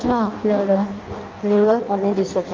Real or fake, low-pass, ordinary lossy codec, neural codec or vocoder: fake; 7.2 kHz; Opus, 24 kbps; codec, 16 kHz in and 24 kHz out, 0.6 kbps, FireRedTTS-2 codec